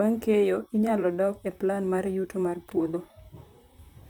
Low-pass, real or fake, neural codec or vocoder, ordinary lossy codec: none; fake; vocoder, 44.1 kHz, 128 mel bands, Pupu-Vocoder; none